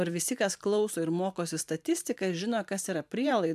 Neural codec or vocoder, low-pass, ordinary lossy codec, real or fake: vocoder, 44.1 kHz, 128 mel bands every 512 samples, BigVGAN v2; 14.4 kHz; AAC, 96 kbps; fake